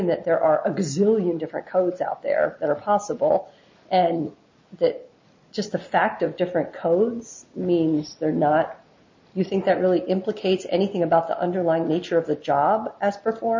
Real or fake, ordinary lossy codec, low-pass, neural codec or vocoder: real; MP3, 48 kbps; 7.2 kHz; none